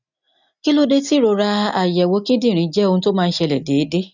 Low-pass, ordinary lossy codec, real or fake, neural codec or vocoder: 7.2 kHz; none; real; none